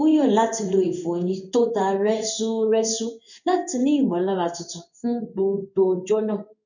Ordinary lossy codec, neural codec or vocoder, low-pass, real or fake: none; codec, 16 kHz in and 24 kHz out, 1 kbps, XY-Tokenizer; 7.2 kHz; fake